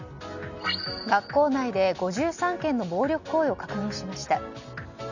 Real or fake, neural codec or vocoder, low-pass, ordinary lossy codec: real; none; 7.2 kHz; none